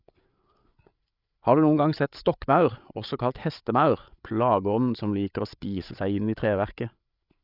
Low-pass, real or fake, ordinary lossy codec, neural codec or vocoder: 5.4 kHz; fake; none; codec, 16 kHz, 8 kbps, FreqCodec, larger model